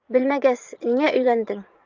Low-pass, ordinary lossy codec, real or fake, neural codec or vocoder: 7.2 kHz; Opus, 24 kbps; fake; vocoder, 44.1 kHz, 80 mel bands, Vocos